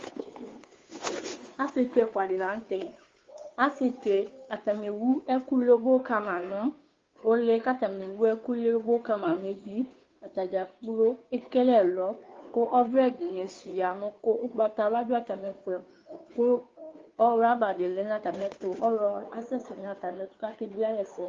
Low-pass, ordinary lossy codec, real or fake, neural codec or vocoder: 7.2 kHz; Opus, 32 kbps; fake; codec, 16 kHz, 2 kbps, FunCodec, trained on Chinese and English, 25 frames a second